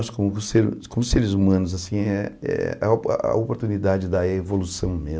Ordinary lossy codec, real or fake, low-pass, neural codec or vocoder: none; real; none; none